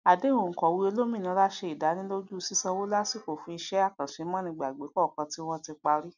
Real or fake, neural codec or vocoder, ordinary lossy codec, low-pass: real; none; none; 7.2 kHz